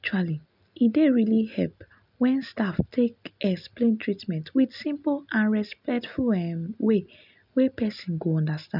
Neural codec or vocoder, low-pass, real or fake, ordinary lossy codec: none; 5.4 kHz; real; none